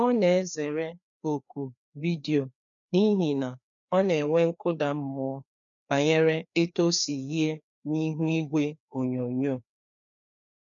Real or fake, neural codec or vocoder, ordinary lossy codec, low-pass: fake; codec, 16 kHz, 2 kbps, FreqCodec, larger model; none; 7.2 kHz